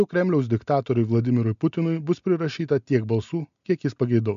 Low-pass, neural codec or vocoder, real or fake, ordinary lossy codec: 7.2 kHz; none; real; MP3, 64 kbps